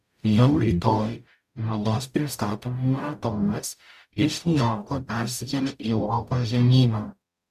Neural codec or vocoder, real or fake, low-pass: codec, 44.1 kHz, 0.9 kbps, DAC; fake; 14.4 kHz